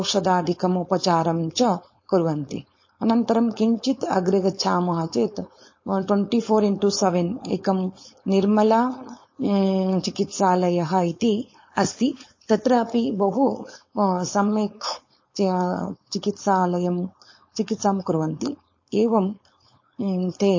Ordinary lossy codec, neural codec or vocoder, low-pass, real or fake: MP3, 32 kbps; codec, 16 kHz, 4.8 kbps, FACodec; 7.2 kHz; fake